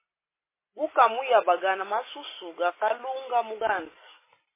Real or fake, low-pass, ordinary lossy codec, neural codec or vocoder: real; 3.6 kHz; MP3, 16 kbps; none